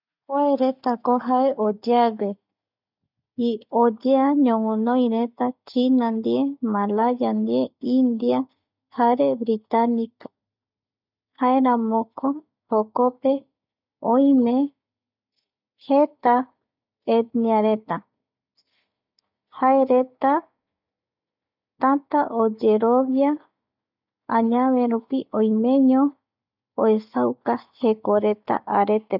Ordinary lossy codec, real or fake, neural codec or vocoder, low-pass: none; real; none; 5.4 kHz